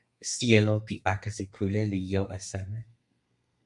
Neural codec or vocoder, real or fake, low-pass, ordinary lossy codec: codec, 32 kHz, 1.9 kbps, SNAC; fake; 10.8 kHz; MP3, 64 kbps